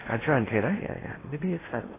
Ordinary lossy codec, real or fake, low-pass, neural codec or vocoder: AAC, 16 kbps; fake; 3.6 kHz; codec, 16 kHz in and 24 kHz out, 0.8 kbps, FocalCodec, streaming, 65536 codes